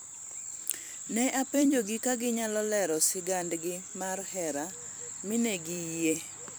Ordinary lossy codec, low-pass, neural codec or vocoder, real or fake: none; none; vocoder, 44.1 kHz, 128 mel bands every 256 samples, BigVGAN v2; fake